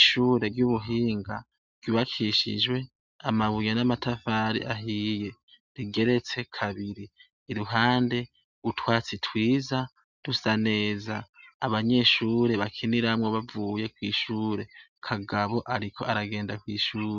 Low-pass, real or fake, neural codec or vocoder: 7.2 kHz; real; none